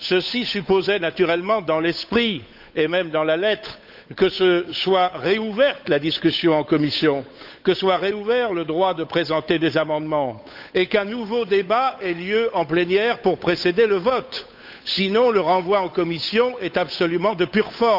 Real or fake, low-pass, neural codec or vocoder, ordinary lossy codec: fake; 5.4 kHz; codec, 16 kHz, 8 kbps, FunCodec, trained on Chinese and English, 25 frames a second; none